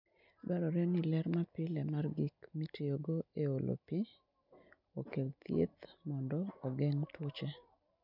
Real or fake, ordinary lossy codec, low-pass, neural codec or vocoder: real; none; 5.4 kHz; none